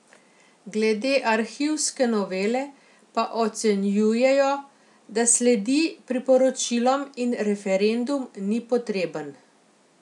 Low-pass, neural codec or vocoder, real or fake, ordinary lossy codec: 10.8 kHz; none; real; none